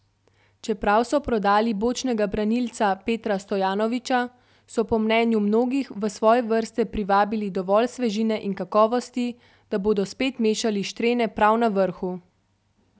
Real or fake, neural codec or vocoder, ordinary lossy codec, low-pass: real; none; none; none